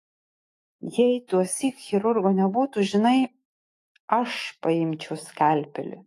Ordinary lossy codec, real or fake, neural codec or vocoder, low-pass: AAC, 48 kbps; fake; autoencoder, 48 kHz, 128 numbers a frame, DAC-VAE, trained on Japanese speech; 14.4 kHz